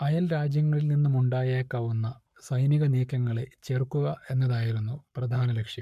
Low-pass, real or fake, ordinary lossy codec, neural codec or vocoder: 14.4 kHz; fake; MP3, 96 kbps; codec, 44.1 kHz, 7.8 kbps, Pupu-Codec